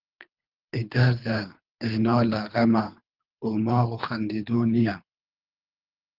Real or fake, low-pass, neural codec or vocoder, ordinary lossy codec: fake; 5.4 kHz; codec, 24 kHz, 3 kbps, HILCodec; Opus, 24 kbps